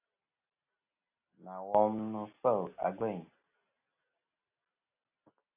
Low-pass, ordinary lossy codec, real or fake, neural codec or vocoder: 3.6 kHz; AAC, 32 kbps; real; none